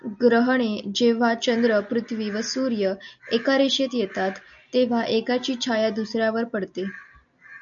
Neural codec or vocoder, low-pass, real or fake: none; 7.2 kHz; real